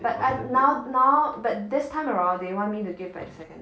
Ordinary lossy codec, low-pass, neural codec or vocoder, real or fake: none; none; none; real